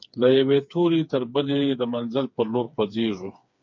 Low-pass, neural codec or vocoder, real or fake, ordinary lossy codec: 7.2 kHz; codec, 16 kHz, 4 kbps, FreqCodec, smaller model; fake; MP3, 48 kbps